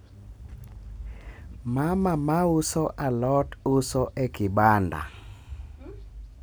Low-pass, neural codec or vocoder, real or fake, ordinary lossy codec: none; none; real; none